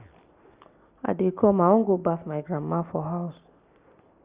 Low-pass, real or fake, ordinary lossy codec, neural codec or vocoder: 3.6 kHz; real; Opus, 64 kbps; none